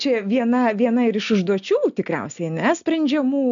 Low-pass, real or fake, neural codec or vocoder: 7.2 kHz; real; none